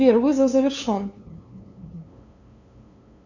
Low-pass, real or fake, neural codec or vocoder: 7.2 kHz; fake; codec, 16 kHz, 2 kbps, FunCodec, trained on LibriTTS, 25 frames a second